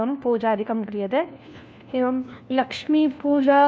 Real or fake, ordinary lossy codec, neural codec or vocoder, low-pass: fake; none; codec, 16 kHz, 1 kbps, FunCodec, trained on LibriTTS, 50 frames a second; none